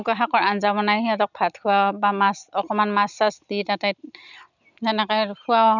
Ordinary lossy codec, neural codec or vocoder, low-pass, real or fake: none; none; 7.2 kHz; real